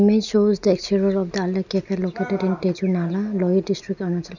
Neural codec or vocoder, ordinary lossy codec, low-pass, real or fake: none; none; 7.2 kHz; real